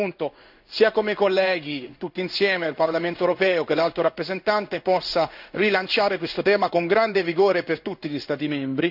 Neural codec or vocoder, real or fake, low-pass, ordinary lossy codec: codec, 16 kHz in and 24 kHz out, 1 kbps, XY-Tokenizer; fake; 5.4 kHz; none